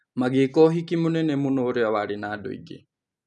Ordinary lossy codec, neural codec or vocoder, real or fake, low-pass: none; none; real; 10.8 kHz